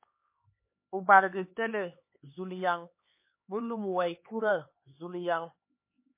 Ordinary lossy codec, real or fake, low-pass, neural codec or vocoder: MP3, 24 kbps; fake; 3.6 kHz; codec, 16 kHz, 4 kbps, X-Codec, HuBERT features, trained on LibriSpeech